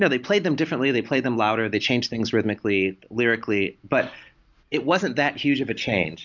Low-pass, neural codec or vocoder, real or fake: 7.2 kHz; none; real